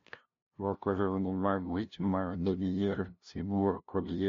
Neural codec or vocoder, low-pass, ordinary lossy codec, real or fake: codec, 16 kHz, 0.5 kbps, FunCodec, trained on LibriTTS, 25 frames a second; 7.2 kHz; MP3, 48 kbps; fake